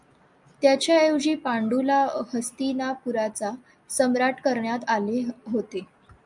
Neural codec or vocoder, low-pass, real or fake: none; 10.8 kHz; real